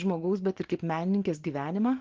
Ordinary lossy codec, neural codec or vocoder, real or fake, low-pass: Opus, 16 kbps; none; real; 7.2 kHz